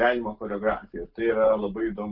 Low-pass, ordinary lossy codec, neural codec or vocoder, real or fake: 5.4 kHz; Opus, 32 kbps; none; real